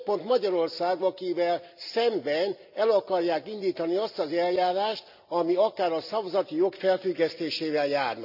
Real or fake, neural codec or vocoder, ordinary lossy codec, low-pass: real; none; none; 5.4 kHz